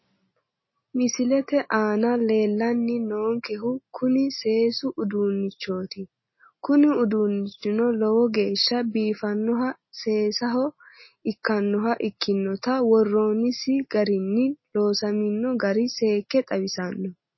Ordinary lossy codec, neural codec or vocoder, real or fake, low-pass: MP3, 24 kbps; none; real; 7.2 kHz